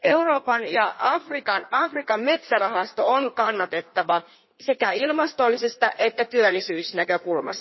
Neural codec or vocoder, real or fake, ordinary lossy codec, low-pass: codec, 16 kHz in and 24 kHz out, 1.1 kbps, FireRedTTS-2 codec; fake; MP3, 24 kbps; 7.2 kHz